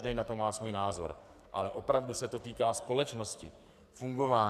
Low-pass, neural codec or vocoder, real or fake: 14.4 kHz; codec, 44.1 kHz, 2.6 kbps, SNAC; fake